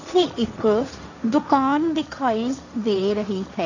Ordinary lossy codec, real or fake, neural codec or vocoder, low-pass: none; fake; codec, 16 kHz, 1.1 kbps, Voila-Tokenizer; 7.2 kHz